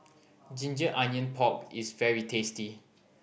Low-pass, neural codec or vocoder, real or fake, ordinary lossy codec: none; none; real; none